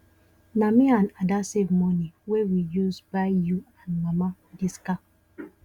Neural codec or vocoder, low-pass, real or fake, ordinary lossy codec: none; 19.8 kHz; real; Opus, 64 kbps